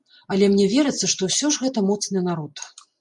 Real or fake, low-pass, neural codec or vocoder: real; 10.8 kHz; none